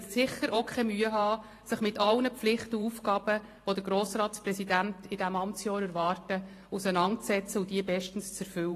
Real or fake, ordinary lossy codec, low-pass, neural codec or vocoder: fake; AAC, 48 kbps; 14.4 kHz; vocoder, 44.1 kHz, 128 mel bands every 512 samples, BigVGAN v2